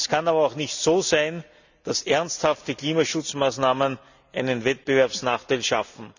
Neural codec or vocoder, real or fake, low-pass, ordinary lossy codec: none; real; 7.2 kHz; none